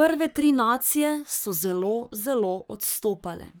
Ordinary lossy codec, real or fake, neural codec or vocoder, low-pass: none; fake; codec, 44.1 kHz, 3.4 kbps, Pupu-Codec; none